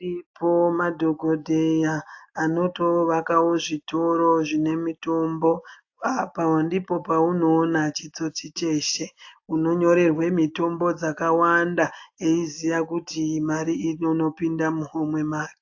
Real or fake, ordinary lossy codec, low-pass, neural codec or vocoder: real; AAC, 48 kbps; 7.2 kHz; none